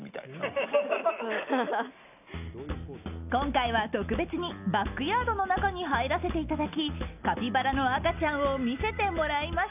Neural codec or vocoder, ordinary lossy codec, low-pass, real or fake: none; none; 3.6 kHz; real